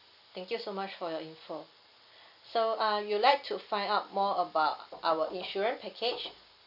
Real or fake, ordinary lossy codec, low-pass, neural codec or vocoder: real; none; 5.4 kHz; none